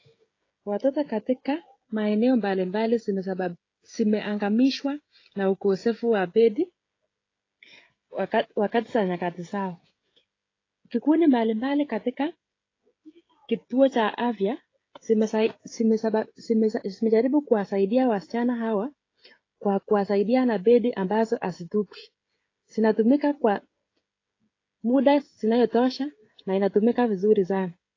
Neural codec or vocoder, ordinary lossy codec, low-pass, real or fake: codec, 16 kHz, 16 kbps, FreqCodec, smaller model; AAC, 32 kbps; 7.2 kHz; fake